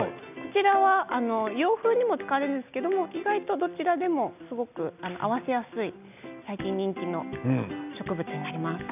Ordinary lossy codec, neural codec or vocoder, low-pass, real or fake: none; none; 3.6 kHz; real